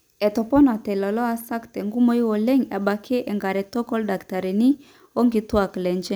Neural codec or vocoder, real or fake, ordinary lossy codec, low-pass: none; real; none; none